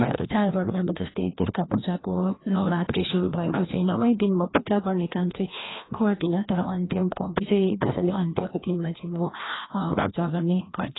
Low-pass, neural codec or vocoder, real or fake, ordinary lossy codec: 7.2 kHz; codec, 16 kHz, 1 kbps, FreqCodec, larger model; fake; AAC, 16 kbps